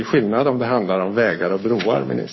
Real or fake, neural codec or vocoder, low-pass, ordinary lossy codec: fake; codec, 44.1 kHz, 7.8 kbps, Pupu-Codec; 7.2 kHz; MP3, 24 kbps